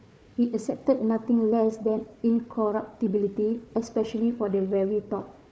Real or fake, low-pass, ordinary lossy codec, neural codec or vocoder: fake; none; none; codec, 16 kHz, 4 kbps, FunCodec, trained on Chinese and English, 50 frames a second